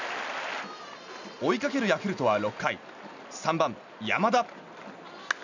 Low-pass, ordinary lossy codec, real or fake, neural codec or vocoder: 7.2 kHz; none; real; none